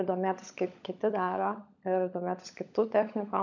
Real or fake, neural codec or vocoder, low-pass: fake; codec, 16 kHz, 16 kbps, FunCodec, trained on LibriTTS, 50 frames a second; 7.2 kHz